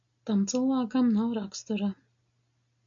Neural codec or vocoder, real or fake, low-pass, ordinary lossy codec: none; real; 7.2 kHz; MP3, 48 kbps